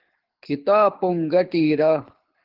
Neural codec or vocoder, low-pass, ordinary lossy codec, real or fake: codec, 24 kHz, 6 kbps, HILCodec; 5.4 kHz; Opus, 16 kbps; fake